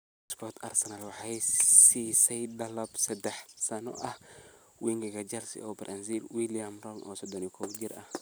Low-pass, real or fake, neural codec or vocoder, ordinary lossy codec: none; real; none; none